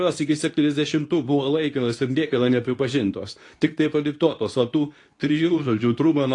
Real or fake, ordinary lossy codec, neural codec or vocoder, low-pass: fake; AAC, 48 kbps; codec, 24 kHz, 0.9 kbps, WavTokenizer, medium speech release version 2; 10.8 kHz